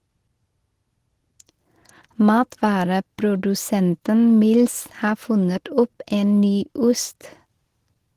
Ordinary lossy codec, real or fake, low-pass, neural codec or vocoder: Opus, 16 kbps; real; 14.4 kHz; none